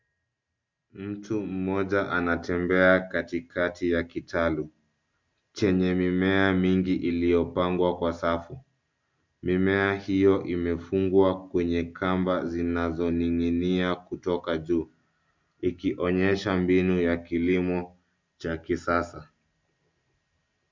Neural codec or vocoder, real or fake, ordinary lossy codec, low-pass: none; real; MP3, 64 kbps; 7.2 kHz